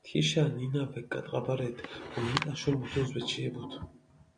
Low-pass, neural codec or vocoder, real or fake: 9.9 kHz; none; real